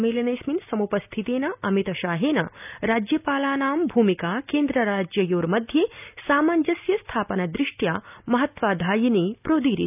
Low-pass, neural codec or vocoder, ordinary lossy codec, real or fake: 3.6 kHz; none; none; real